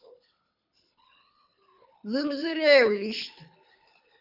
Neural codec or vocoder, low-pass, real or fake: codec, 24 kHz, 6 kbps, HILCodec; 5.4 kHz; fake